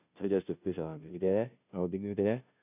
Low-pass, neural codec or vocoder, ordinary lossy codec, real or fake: 3.6 kHz; codec, 16 kHz, 0.5 kbps, FunCodec, trained on Chinese and English, 25 frames a second; none; fake